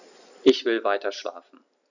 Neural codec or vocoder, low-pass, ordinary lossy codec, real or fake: none; 7.2 kHz; none; real